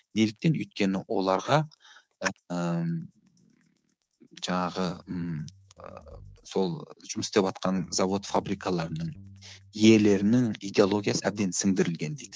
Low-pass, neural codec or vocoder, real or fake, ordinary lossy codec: none; codec, 16 kHz, 6 kbps, DAC; fake; none